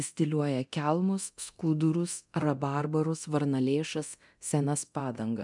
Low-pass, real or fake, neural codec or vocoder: 10.8 kHz; fake; codec, 24 kHz, 0.9 kbps, DualCodec